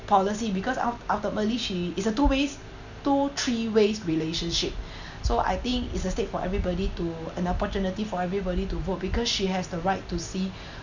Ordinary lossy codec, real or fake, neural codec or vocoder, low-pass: none; real; none; 7.2 kHz